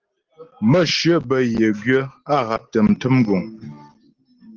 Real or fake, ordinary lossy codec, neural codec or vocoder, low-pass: real; Opus, 16 kbps; none; 7.2 kHz